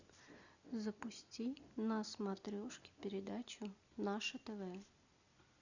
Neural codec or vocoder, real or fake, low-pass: none; real; 7.2 kHz